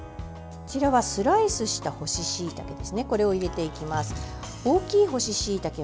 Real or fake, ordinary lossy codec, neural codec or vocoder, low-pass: real; none; none; none